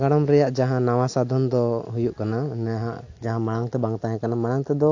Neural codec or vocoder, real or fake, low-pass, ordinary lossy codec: none; real; 7.2 kHz; AAC, 48 kbps